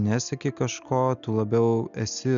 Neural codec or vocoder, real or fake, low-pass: none; real; 7.2 kHz